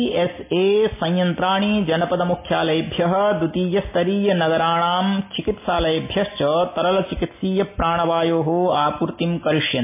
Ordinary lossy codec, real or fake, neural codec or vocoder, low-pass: MP3, 24 kbps; real; none; 3.6 kHz